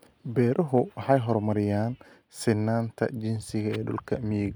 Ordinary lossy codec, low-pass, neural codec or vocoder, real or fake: none; none; none; real